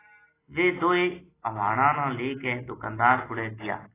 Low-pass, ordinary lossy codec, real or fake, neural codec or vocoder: 3.6 kHz; AAC, 16 kbps; real; none